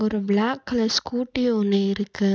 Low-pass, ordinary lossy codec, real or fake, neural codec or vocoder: none; none; real; none